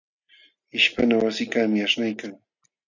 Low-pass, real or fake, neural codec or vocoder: 7.2 kHz; real; none